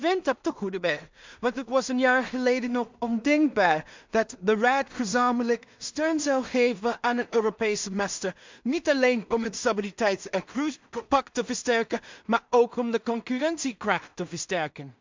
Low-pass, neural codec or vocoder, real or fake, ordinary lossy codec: 7.2 kHz; codec, 16 kHz in and 24 kHz out, 0.4 kbps, LongCat-Audio-Codec, two codebook decoder; fake; MP3, 64 kbps